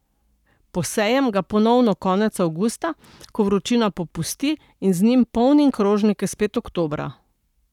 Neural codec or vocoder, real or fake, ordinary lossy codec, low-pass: codec, 44.1 kHz, 7.8 kbps, Pupu-Codec; fake; none; 19.8 kHz